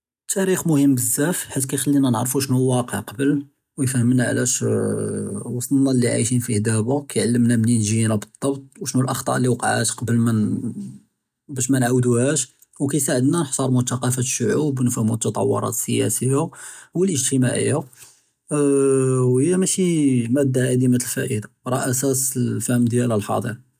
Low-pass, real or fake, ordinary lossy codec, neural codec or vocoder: 14.4 kHz; real; none; none